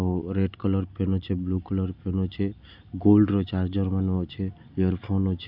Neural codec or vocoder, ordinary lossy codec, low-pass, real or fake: none; none; 5.4 kHz; real